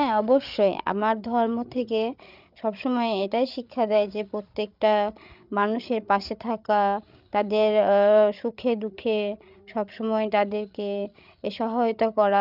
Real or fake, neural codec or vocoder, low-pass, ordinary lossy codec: fake; codec, 16 kHz, 8 kbps, FreqCodec, larger model; 5.4 kHz; none